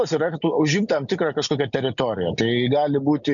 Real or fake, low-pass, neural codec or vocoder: real; 7.2 kHz; none